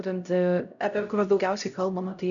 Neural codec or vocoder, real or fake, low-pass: codec, 16 kHz, 0.5 kbps, X-Codec, HuBERT features, trained on LibriSpeech; fake; 7.2 kHz